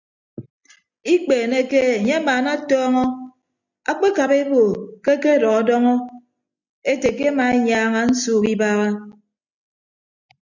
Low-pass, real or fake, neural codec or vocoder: 7.2 kHz; real; none